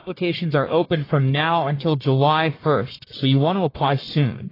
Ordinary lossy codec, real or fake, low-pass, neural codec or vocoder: AAC, 24 kbps; fake; 5.4 kHz; codec, 44.1 kHz, 1.7 kbps, Pupu-Codec